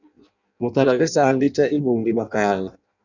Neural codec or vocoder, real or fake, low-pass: codec, 16 kHz in and 24 kHz out, 0.6 kbps, FireRedTTS-2 codec; fake; 7.2 kHz